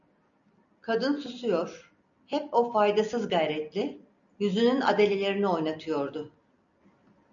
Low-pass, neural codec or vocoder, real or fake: 7.2 kHz; none; real